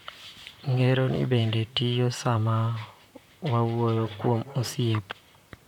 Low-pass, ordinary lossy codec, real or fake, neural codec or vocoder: 19.8 kHz; none; fake; vocoder, 44.1 kHz, 128 mel bands, Pupu-Vocoder